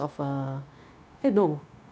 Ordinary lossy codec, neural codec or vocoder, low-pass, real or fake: none; codec, 16 kHz, 0.9 kbps, LongCat-Audio-Codec; none; fake